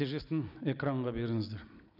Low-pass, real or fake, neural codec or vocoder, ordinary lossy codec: 5.4 kHz; real; none; none